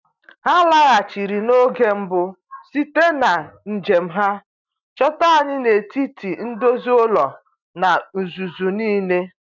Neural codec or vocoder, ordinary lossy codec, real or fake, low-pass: none; none; real; 7.2 kHz